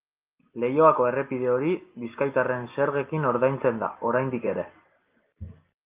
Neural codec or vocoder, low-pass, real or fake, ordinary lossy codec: none; 3.6 kHz; real; Opus, 24 kbps